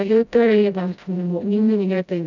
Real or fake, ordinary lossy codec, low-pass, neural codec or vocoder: fake; none; 7.2 kHz; codec, 16 kHz, 0.5 kbps, FreqCodec, smaller model